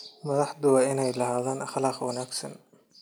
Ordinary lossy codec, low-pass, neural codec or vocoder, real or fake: none; none; none; real